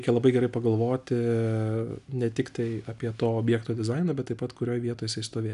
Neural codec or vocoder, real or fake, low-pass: none; real; 10.8 kHz